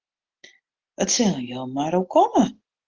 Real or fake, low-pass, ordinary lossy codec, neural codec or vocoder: real; 7.2 kHz; Opus, 16 kbps; none